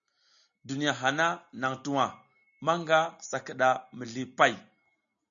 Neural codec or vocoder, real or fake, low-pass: none; real; 7.2 kHz